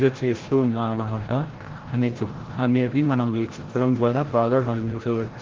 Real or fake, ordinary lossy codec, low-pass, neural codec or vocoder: fake; Opus, 16 kbps; 7.2 kHz; codec, 16 kHz, 0.5 kbps, FreqCodec, larger model